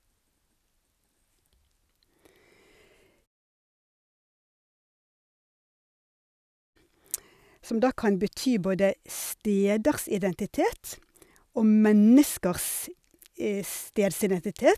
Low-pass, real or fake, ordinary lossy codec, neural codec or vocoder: 14.4 kHz; real; none; none